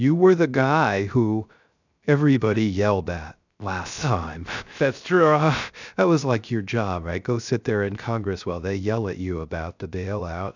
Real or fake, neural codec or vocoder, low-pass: fake; codec, 16 kHz, 0.3 kbps, FocalCodec; 7.2 kHz